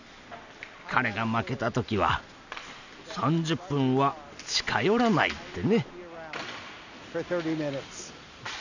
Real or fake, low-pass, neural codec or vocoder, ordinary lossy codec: real; 7.2 kHz; none; none